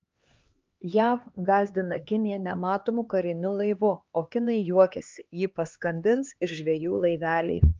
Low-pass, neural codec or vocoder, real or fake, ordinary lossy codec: 7.2 kHz; codec, 16 kHz, 2 kbps, X-Codec, HuBERT features, trained on LibriSpeech; fake; Opus, 24 kbps